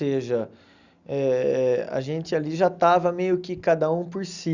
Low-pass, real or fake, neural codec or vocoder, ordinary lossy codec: 7.2 kHz; real; none; Opus, 64 kbps